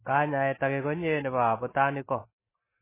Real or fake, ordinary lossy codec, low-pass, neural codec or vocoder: real; MP3, 16 kbps; 3.6 kHz; none